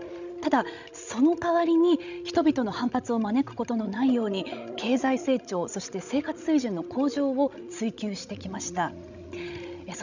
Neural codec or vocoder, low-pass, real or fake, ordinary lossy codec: codec, 16 kHz, 16 kbps, FreqCodec, larger model; 7.2 kHz; fake; none